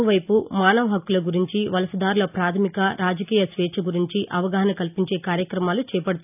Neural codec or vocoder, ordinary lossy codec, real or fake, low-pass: none; none; real; 3.6 kHz